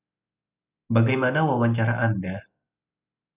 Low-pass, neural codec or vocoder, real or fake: 3.6 kHz; none; real